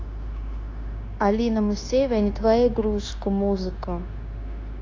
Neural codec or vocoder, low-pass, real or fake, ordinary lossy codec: codec, 16 kHz, 0.9 kbps, LongCat-Audio-Codec; 7.2 kHz; fake; AAC, 32 kbps